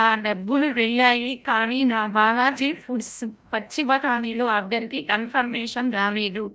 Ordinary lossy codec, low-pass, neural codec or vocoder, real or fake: none; none; codec, 16 kHz, 0.5 kbps, FreqCodec, larger model; fake